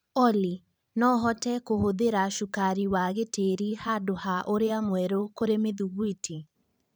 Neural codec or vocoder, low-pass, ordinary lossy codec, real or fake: vocoder, 44.1 kHz, 128 mel bands every 512 samples, BigVGAN v2; none; none; fake